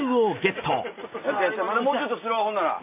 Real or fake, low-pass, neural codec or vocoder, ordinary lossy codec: real; 3.6 kHz; none; AAC, 24 kbps